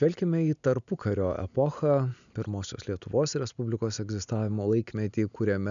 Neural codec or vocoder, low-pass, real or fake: none; 7.2 kHz; real